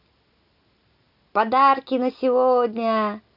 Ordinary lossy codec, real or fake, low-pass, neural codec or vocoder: none; real; 5.4 kHz; none